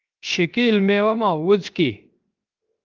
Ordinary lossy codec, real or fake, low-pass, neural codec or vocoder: Opus, 32 kbps; fake; 7.2 kHz; codec, 16 kHz, 0.7 kbps, FocalCodec